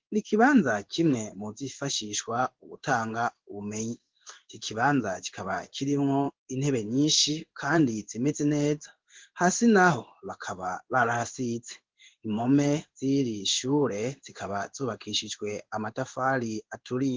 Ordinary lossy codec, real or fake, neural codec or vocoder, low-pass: Opus, 16 kbps; fake; codec, 16 kHz in and 24 kHz out, 1 kbps, XY-Tokenizer; 7.2 kHz